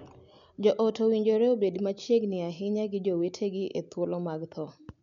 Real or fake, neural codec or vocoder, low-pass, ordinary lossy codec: real; none; 7.2 kHz; none